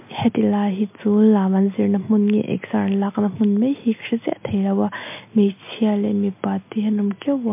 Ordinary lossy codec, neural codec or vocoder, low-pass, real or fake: MP3, 24 kbps; none; 3.6 kHz; real